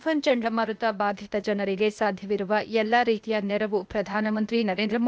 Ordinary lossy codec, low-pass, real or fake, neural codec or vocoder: none; none; fake; codec, 16 kHz, 0.8 kbps, ZipCodec